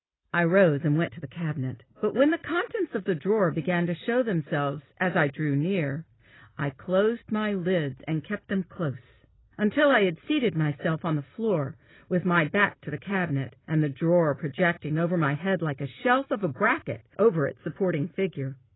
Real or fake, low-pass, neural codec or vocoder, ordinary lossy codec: real; 7.2 kHz; none; AAC, 16 kbps